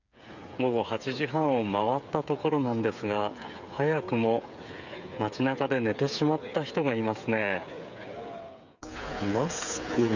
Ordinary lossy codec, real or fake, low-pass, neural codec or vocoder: none; fake; 7.2 kHz; codec, 16 kHz, 8 kbps, FreqCodec, smaller model